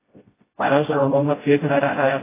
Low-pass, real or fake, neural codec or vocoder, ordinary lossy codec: 3.6 kHz; fake; codec, 16 kHz, 0.5 kbps, FreqCodec, smaller model; AAC, 16 kbps